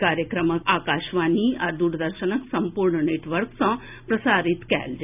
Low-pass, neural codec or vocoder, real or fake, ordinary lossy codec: 3.6 kHz; none; real; none